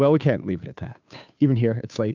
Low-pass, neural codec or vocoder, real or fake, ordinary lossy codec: 7.2 kHz; codec, 16 kHz, 2 kbps, FunCodec, trained on Chinese and English, 25 frames a second; fake; MP3, 64 kbps